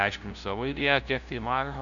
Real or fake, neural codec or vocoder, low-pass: fake; codec, 16 kHz, 0.5 kbps, FunCodec, trained on LibriTTS, 25 frames a second; 7.2 kHz